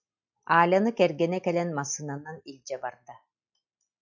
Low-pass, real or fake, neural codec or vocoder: 7.2 kHz; real; none